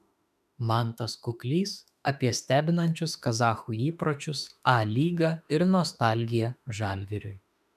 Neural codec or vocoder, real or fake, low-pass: autoencoder, 48 kHz, 32 numbers a frame, DAC-VAE, trained on Japanese speech; fake; 14.4 kHz